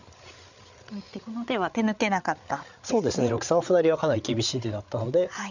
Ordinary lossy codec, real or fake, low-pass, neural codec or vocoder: none; fake; 7.2 kHz; codec, 16 kHz, 8 kbps, FreqCodec, larger model